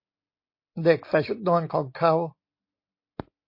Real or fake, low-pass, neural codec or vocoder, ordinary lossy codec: real; 5.4 kHz; none; MP3, 32 kbps